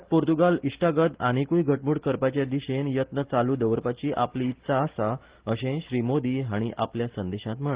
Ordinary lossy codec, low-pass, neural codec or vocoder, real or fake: Opus, 16 kbps; 3.6 kHz; none; real